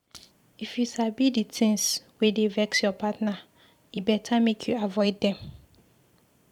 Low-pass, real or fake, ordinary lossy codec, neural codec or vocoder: 19.8 kHz; fake; none; vocoder, 44.1 kHz, 128 mel bands every 512 samples, BigVGAN v2